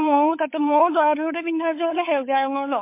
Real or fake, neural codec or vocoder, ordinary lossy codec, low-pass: fake; codec, 16 kHz, 8 kbps, FunCodec, trained on LibriTTS, 25 frames a second; MP3, 24 kbps; 3.6 kHz